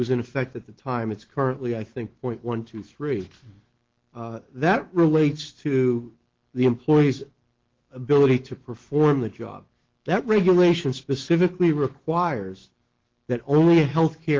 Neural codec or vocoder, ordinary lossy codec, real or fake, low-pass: autoencoder, 48 kHz, 128 numbers a frame, DAC-VAE, trained on Japanese speech; Opus, 16 kbps; fake; 7.2 kHz